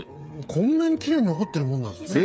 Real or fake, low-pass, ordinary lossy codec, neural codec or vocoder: fake; none; none; codec, 16 kHz, 8 kbps, FreqCodec, smaller model